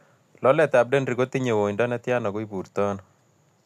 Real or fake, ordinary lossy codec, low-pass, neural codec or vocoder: real; none; 14.4 kHz; none